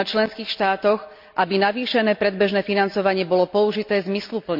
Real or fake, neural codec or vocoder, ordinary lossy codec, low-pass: real; none; MP3, 48 kbps; 5.4 kHz